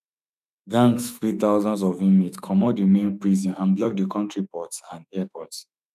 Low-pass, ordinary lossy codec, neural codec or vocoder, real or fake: 14.4 kHz; none; autoencoder, 48 kHz, 32 numbers a frame, DAC-VAE, trained on Japanese speech; fake